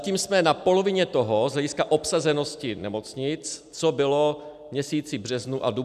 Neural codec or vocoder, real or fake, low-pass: none; real; 14.4 kHz